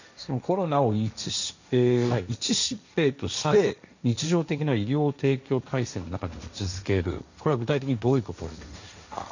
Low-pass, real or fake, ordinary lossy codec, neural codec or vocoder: 7.2 kHz; fake; none; codec, 16 kHz, 1.1 kbps, Voila-Tokenizer